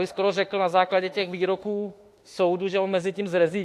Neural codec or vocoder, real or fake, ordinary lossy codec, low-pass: autoencoder, 48 kHz, 32 numbers a frame, DAC-VAE, trained on Japanese speech; fake; AAC, 64 kbps; 14.4 kHz